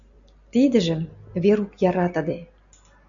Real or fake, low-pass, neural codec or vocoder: real; 7.2 kHz; none